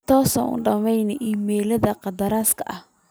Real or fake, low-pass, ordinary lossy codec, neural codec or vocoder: real; none; none; none